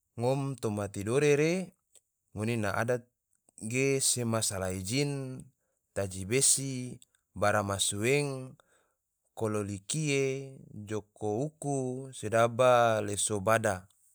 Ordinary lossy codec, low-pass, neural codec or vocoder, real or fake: none; none; none; real